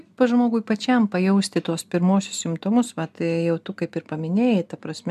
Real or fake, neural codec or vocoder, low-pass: real; none; 14.4 kHz